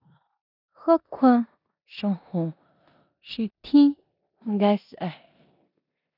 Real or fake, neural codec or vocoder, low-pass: fake; codec, 16 kHz in and 24 kHz out, 0.9 kbps, LongCat-Audio-Codec, four codebook decoder; 5.4 kHz